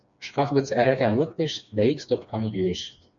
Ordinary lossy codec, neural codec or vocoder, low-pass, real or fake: MP3, 64 kbps; codec, 16 kHz, 2 kbps, FreqCodec, smaller model; 7.2 kHz; fake